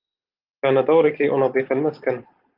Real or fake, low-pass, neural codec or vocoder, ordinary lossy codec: real; 5.4 kHz; none; Opus, 32 kbps